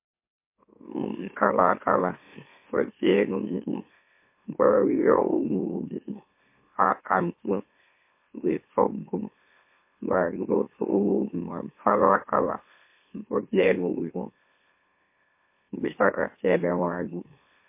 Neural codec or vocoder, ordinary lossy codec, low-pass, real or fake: autoencoder, 44.1 kHz, a latent of 192 numbers a frame, MeloTTS; MP3, 24 kbps; 3.6 kHz; fake